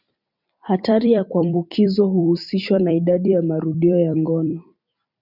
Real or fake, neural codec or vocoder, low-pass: fake; vocoder, 44.1 kHz, 128 mel bands every 256 samples, BigVGAN v2; 5.4 kHz